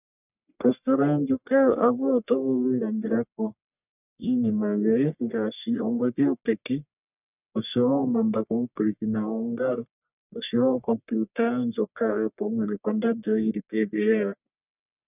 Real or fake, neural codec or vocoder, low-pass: fake; codec, 44.1 kHz, 1.7 kbps, Pupu-Codec; 3.6 kHz